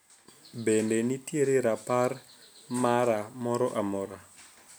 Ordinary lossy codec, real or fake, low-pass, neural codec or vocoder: none; real; none; none